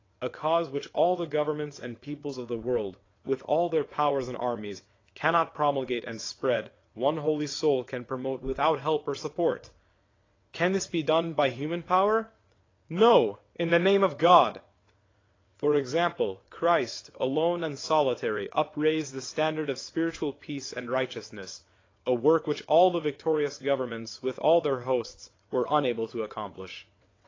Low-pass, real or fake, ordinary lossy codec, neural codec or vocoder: 7.2 kHz; fake; AAC, 32 kbps; vocoder, 22.05 kHz, 80 mel bands, WaveNeXt